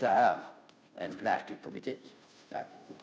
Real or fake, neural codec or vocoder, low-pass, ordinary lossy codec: fake; codec, 16 kHz, 0.5 kbps, FunCodec, trained on Chinese and English, 25 frames a second; none; none